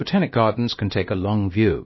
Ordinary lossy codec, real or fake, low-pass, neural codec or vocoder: MP3, 24 kbps; fake; 7.2 kHz; codec, 16 kHz, about 1 kbps, DyCAST, with the encoder's durations